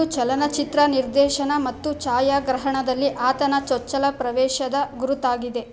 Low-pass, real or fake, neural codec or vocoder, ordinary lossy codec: none; real; none; none